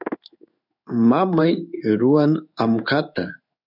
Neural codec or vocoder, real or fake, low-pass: codec, 16 kHz in and 24 kHz out, 1 kbps, XY-Tokenizer; fake; 5.4 kHz